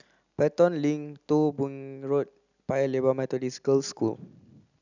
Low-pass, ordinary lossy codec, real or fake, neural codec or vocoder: 7.2 kHz; none; real; none